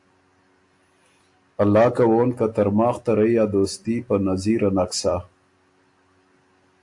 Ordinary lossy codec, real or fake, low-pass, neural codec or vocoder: AAC, 64 kbps; real; 10.8 kHz; none